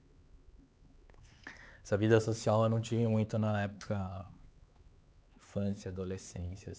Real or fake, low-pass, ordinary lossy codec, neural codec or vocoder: fake; none; none; codec, 16 kHz, 4 kbps, X-Codec, HuBERT features, trained on LibriSpeech